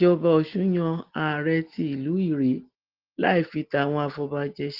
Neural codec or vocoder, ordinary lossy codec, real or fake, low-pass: none; Opus, 16 kbps; real; 5.4 kHz